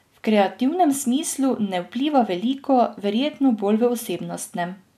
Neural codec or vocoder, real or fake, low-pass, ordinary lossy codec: none; real; 14.4 kHz; none